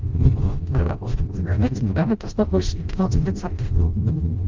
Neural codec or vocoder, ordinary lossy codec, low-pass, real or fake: codec, 16 kHz, 0.5 kbps, FreqCodec, smaller model; Opus, 32 kbps; 7.2 kHz; fake